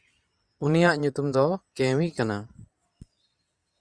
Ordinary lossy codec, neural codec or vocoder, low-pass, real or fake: Opus, 64 kbps; vocoder, 22.05 kHz, 80 mel bands, Vocos; 9.9 kHz; fake